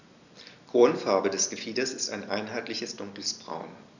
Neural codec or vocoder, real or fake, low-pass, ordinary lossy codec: none; real; 7.2 kHz; none